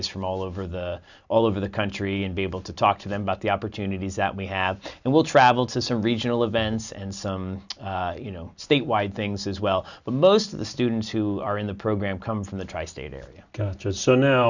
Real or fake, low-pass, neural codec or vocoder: real; 7.2 kHz; none